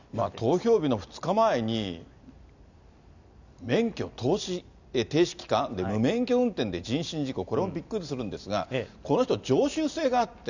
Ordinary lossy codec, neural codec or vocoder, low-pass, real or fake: none; none; 7.2 kHz; real